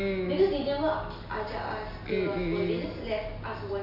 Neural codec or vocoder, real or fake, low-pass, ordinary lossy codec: none; real; 5.4 kHz; none